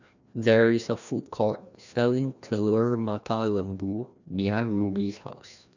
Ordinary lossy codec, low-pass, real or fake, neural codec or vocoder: none; 7.2 kHz; fake; codec, 16 kHz, 1 kbps, FreqCodec, larger model